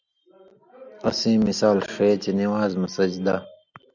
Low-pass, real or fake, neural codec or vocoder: 7.2 kHz; real; none